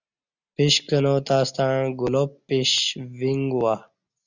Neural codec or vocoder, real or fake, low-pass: none; real; 7.2 kHz